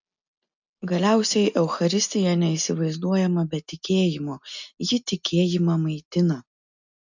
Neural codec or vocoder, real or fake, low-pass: none; real; 7.2 kHz